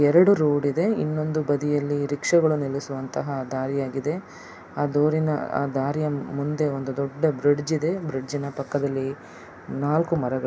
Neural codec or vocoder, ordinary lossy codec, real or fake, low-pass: none; none; real; none